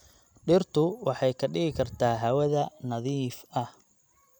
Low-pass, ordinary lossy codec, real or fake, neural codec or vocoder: none; none; real; none